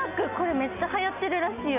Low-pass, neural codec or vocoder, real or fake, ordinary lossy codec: 3.6 kHz; none; real; none